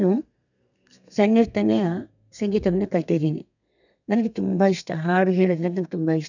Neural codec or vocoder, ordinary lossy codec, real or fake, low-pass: codec, 32 kHz, 1.9 kbps, SNAC; none; fake; 7.2 kHz